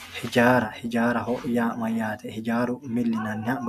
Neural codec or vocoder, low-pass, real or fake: none; 14.4 kHz; real